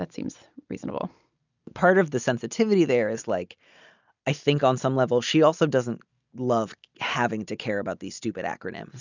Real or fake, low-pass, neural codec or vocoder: real; 7.2 kHz; none